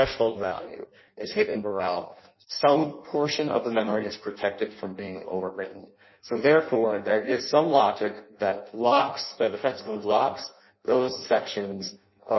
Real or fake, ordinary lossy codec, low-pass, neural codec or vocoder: fake; MP3, 24 kbps; 7.2 kHz; codec, 16 kHz in and 24 kHz out, 0.6 kbps, FireRedTTS-2 codec